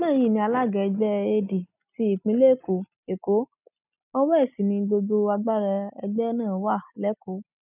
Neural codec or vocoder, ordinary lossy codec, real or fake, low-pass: none; none; real; 3.6 kHz